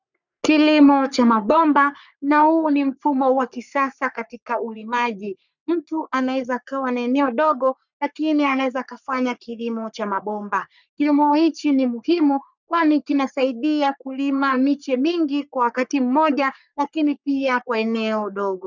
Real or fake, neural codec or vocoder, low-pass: fake; codec, 44.1 kHz, 3.4 kbps, Pupu-Codec; 7.2 kHz